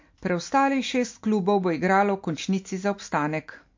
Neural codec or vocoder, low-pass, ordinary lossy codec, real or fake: none; 7.2 kHz; MP3, 48 kbps; real